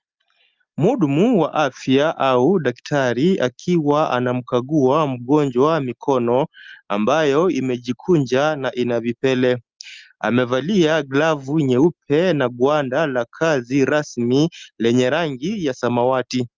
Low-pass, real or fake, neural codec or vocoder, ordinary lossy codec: 7.2 kHz; real; none; Opus, 24 kbps